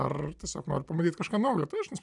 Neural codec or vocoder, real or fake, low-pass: none; real; 10.8 kHz